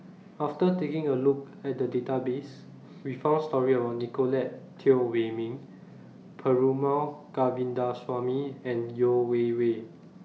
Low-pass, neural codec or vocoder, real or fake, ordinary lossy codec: none; none; real; none